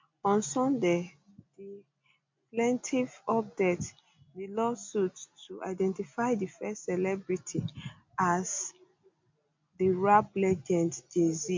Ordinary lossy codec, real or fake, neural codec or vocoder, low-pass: MP3, 48 kbps; real; none; 7.2 kHz